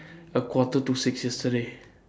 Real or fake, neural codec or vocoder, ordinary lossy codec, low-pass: real; none; none; none